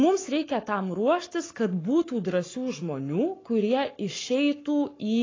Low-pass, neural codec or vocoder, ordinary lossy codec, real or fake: 7.2 kHz; codec, 44.1 kHz, 7.8 kbps, Pupu-Codec; AAC, 32 kbps; fake